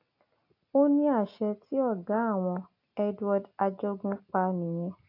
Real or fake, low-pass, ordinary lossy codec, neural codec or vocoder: real; 5.4 kHz; AAC, 32 kbps; none